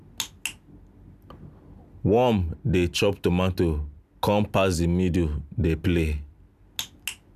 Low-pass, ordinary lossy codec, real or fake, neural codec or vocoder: 14.4 kHz; none; real; none